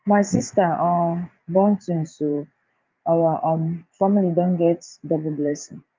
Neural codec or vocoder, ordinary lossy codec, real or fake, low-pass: vocoder, 44.1 kHz, 80 mel bands, Vocos; Opus, 24 kbps; fake; 7.2 kHz